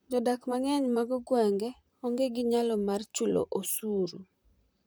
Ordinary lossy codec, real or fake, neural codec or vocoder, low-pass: none; fake; vocoder, 44.1 kHz, 128 mel bands, Pupu-Vocoder; none